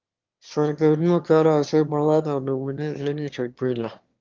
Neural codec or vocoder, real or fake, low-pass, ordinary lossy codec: autoencoder, 22.05 kHz, a latent of 192 numbers a frame, VITS, trained on one speaker; fake; 7.2 kHz; Opus, 32 kbps